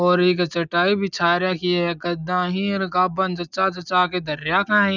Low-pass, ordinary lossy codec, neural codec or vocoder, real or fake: 7.2 kHz; none; none; real